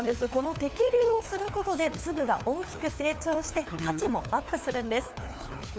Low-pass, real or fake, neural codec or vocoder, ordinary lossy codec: none; fake; codec, 16 kHz, 4 kbps, FunCodec, trained on LibriTTS, 50 frames a second; none